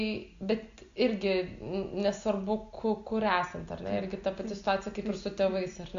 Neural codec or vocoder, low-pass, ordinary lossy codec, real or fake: none; 7.2 kHz; Opus, 64 kbps; real